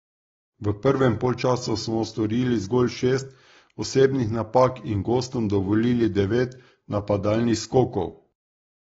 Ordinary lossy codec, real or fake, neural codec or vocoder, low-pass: AAC, 24 kbps; real; none; 7.2 kHz